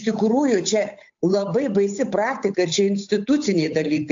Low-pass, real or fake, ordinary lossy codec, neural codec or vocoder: 7.2 kHz; fake; AAC, 64 kbps; codec, 16 kHz, 8 kbps, FunCodec, trained on Chinese and English, 25 frames a second